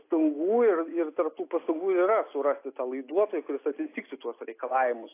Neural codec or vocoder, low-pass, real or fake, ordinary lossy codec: none; 3.6 kHz; real; AAC, 24 kbps